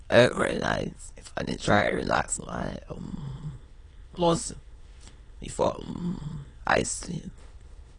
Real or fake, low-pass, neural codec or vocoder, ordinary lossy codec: fake; 9.9 kHz; autoencoder, 22.05 kHz, a latent of 192 numbers a frame, VITS, trained on many speakers; AAC, 32 kbps